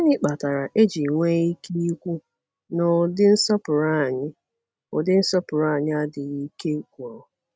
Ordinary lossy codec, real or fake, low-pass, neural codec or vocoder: none; real; none; none